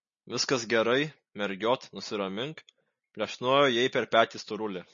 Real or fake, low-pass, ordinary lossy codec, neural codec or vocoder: real; 7.2 kHz; MP3, 32 kbps; none